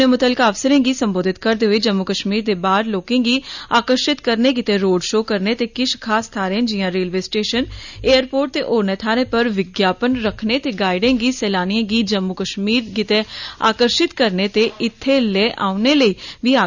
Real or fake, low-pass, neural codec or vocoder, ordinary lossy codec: real; 7.2 kHz; none; none